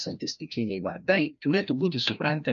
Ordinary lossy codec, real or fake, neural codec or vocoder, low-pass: MP3, 96 kbps; fake; codec, 16 kHz, 1 kbps, FreqCodec, larger model; 7.2 kHz